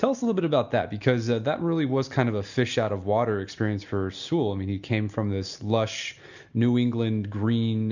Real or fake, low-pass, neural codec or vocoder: real; 7.2 kHz; none